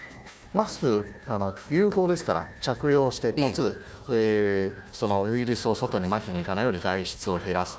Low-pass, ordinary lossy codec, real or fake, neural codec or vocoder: none; none; fake; codec, 16 kHz, 1 kbps, FunCodec, trained on Chinese and English, 50 frames a second